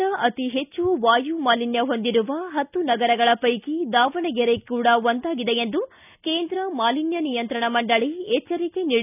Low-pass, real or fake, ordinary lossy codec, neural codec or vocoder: 3.6 kHz; real; none; none